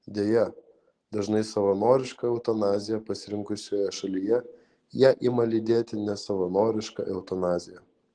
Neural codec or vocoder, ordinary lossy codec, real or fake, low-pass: none; Opus, 16 kbps; real; 9.9 kHz